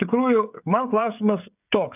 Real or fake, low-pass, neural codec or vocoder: fake; 3.6 kHz; codec, 16 kHz, 16 kbps, FreqCodec, smaller model